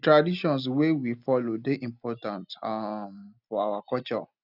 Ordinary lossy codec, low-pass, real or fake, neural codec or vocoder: none; 5.4 kHz; real; none